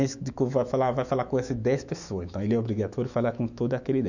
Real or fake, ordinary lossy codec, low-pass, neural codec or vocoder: fake; none; 7.2 kHz; autoencoder, 48 kHz, 128 numbers a frame, DAC-VAE, trained on Japanese speech